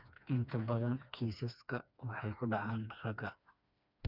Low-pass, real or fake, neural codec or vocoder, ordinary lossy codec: 5.4 kHz; fake; codec, 16 kHz, 2 kbps, FreqCodec, smaller model; none